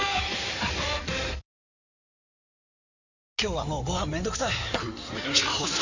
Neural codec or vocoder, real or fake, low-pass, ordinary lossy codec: codec, 16 kHz in and 24 kHz out, 2.2 kbps, FireRedTTS-2 codec; fake; 7.2 kHz; none